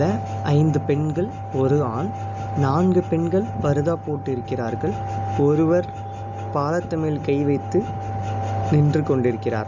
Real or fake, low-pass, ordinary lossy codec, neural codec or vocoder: real; 7.2 kHz; none; none